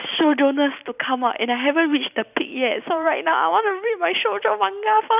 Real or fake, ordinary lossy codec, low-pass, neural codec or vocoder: fake; none; 3.6 kHz; vocoder, 44.1 kHz, 128 mel bands every 256 samples, BigVGAN v2